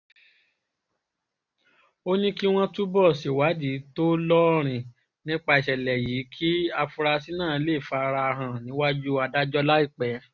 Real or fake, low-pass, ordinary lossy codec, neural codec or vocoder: real; 7.2 kHz; none; none